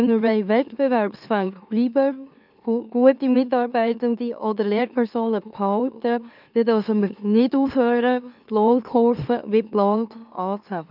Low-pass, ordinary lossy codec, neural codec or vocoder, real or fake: 5.4 kHz; none; autoencoder, 44.1 kHz, a latent of 192 numbers a frame, MeloTTS; fake